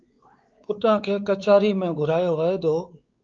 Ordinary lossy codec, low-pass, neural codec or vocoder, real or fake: Opus, 32 kbps; 7.2 kHz; codec, 16 kHz, 4 kbps, X-Codec, WavLM features, trained on Multilingual LibriSpeech; fake